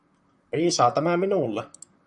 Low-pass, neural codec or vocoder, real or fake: 10.8 kHz; vocoder, 44.1 kHz, 128 mel bands, Pupu-Vocoder; fake